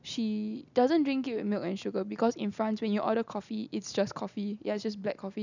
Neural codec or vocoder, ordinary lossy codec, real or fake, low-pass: none; none; real; 7.2 kHz